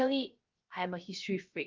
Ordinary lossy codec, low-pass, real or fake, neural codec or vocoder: Opus, 32 kbps; 7.2 kHz; fake; codec, 16 kHz, 0.3 kbps, FocalCodec